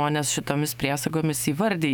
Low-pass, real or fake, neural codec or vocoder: 19.8 kHz; fake; autoencoder, 48 kHz, 128 numbers a frame, DAC-VAE, trained on Japanese speech